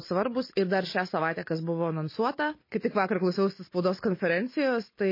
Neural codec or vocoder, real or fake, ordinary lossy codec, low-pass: none; real; MP3, 24 kbps; 5.4 kHz